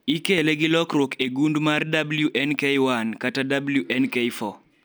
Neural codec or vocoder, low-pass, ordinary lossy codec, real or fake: none; none; none; real